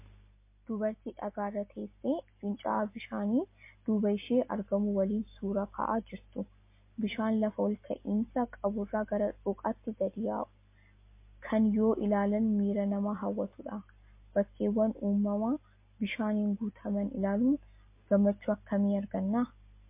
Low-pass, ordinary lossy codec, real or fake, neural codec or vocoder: 3.6 kHz; MP3, 24 kbps; real; none